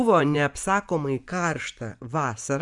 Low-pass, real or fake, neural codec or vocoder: 10.8 kHz; fake; vocoder, 24 kHz, 100 mel bands, Vocos